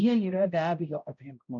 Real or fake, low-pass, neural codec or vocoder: fake; 7.2 kHz; codec, 16 kHz, 0.5 kbps, X-Codec, HuBERT features, trained on balanced general audio